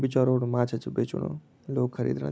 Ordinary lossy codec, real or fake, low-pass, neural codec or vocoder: none; real; none; none